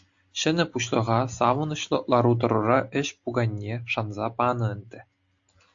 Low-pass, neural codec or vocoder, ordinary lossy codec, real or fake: 7.2 kHz; none; AAC, 64 kbps; real